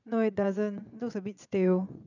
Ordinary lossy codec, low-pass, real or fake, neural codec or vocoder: none; 7.2 kHz; fake; vocoder, 22.05 kHz, 80 mel bands, Vocos